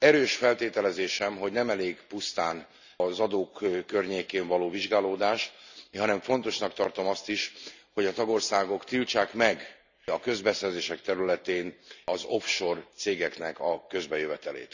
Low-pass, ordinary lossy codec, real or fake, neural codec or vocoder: 7.2 kHz; none; real; none